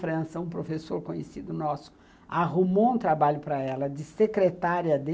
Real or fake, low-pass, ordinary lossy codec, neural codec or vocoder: real; none; none; none